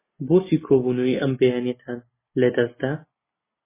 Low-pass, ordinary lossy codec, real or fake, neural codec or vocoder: 3.6 kHz; MP3, 16 kbps; real; none